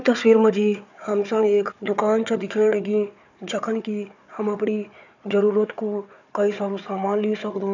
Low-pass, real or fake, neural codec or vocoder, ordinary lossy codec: 7.2 kHz; fake; codec, 16 kHz, 4 kbps, FunCodec, trained on Chinese and English, 50 frames a second; none